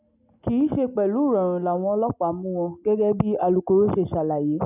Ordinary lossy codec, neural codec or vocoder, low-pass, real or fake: none; none; 3.6 kHz; real